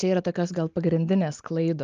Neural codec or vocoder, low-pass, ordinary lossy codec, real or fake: none; 7.2 kHz; Opus, 24 kbps; real